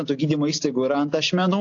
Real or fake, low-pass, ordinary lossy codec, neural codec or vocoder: real; 7.2 kHz; AAC, 48 kbps; none